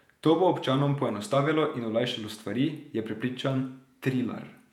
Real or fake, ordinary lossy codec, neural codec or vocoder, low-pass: fake; none; vocoder, 48 kHz, 128 mel bands, Vocos; 19.8 kHz